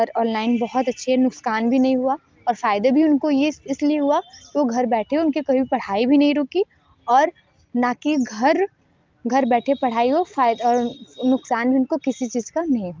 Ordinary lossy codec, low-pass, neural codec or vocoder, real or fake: Opus, 32 kbps; 7.2 kHz; none; real